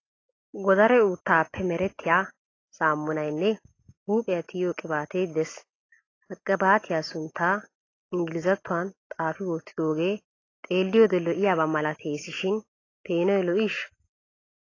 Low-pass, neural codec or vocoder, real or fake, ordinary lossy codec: 7.2 kHz; none; real; AAC, 32 kbps